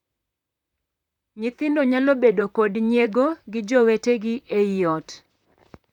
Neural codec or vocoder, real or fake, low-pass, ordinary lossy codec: vocoder, 44.1 kHz, 128 mel bands, Pupu-Vocoder; fake; 19.8 kHz; none